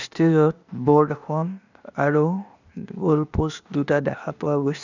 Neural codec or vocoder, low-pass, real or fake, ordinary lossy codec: codec, 16 kHz, 0.8 kbps, ZipCodec; 7.2 kHz; fake; none